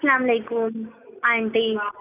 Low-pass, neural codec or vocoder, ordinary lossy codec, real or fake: 3.6 kHz; none; none; real